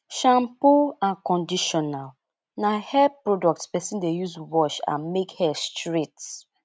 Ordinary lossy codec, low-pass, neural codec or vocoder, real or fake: none; none; none; real